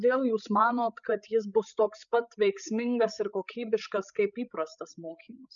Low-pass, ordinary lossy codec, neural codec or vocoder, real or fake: 7.2 kHz; MP3, 96 kbps; codec, 16 kHz, 8 kbps, FreqCodec, larger model; fake